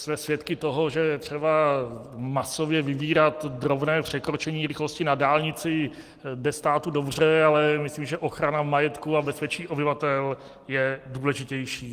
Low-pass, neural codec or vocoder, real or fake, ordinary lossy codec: 14.4 kHz; none; real; Opus, 24 kbps